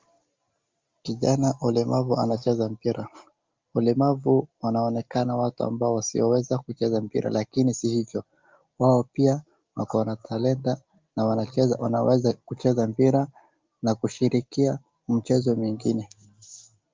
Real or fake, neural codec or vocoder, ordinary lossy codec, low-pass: real; none; Opus, 32 kbps; 7.2 kHz